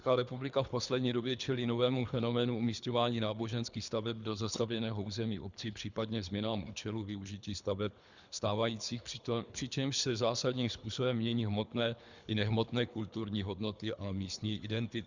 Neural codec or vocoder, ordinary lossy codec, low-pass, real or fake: codec, 24 kHz, 3 kbps, HILCodec; Opus, 64 kbps; 7.2 kHz; fake